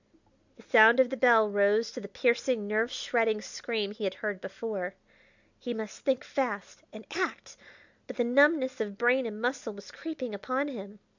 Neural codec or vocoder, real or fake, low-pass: none; real; 7.2 kHz